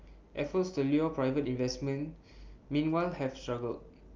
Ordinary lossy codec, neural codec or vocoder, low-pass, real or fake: Opus, 24 kbps; none; 7.2 kHz; real